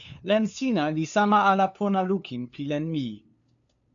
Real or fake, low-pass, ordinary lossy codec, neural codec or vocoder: fake; 7.2 kHz; MP3, 64 kbps; codec, 16 kHz, 2 kbps, FunCodec, trained on LibriTTS, 25 frames a second